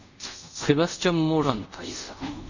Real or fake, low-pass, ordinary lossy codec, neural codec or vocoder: fake; 7.2 kHz; Opus, 64 kbps; codec, 24 kHz, 0.5 kbps, DualCodec